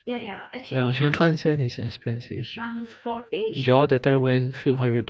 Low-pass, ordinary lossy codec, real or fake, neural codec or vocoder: none; none; fake; codec, 16 kHz, 1 kbps, FreqCodec, larger model